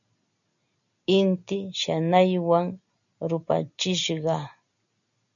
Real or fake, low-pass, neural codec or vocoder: real; 7.2 kHz; none